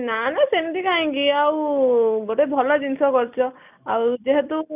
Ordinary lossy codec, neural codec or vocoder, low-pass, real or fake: none; none; 3.6 kHz; real